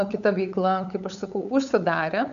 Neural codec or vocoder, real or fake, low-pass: codec, 16 kHz, 8 kbps, FunCodec, trained on LibriTTS, 25 frames a second; fake; 7.2 kHz